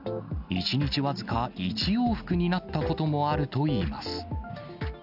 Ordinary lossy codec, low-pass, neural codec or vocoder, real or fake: none; 5.4 kHz; none; real